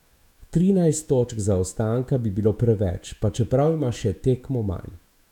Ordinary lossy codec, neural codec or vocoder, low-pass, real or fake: none; autoencoder, 48 kHz, 128 numbers a frame, DAC-VAE, trained on Japanese speech; 19.8 kHz; fake